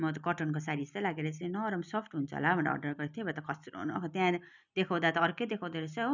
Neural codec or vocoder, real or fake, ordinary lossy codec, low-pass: none; real; none; 7.2 kHz